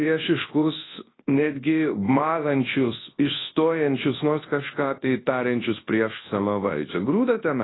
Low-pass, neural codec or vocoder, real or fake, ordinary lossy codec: 7.2 kHz; codec, 24 kHz, 0.9 kbps, WavTokenizer, large speech release; fake; AAC, 16 kbps